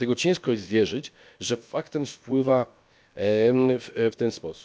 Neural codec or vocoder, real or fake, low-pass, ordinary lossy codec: codec, 16 kHz, about 1 kbps, DyCAST, with the encoder's durations; fake; none; none